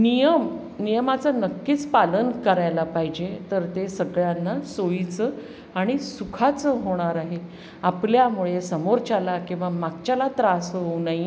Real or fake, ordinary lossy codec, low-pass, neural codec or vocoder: real; none; none; none